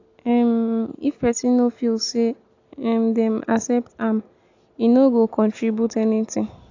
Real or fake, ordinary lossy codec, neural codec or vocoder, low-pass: real; AAC, 48 kbps; none; 7.2 kHz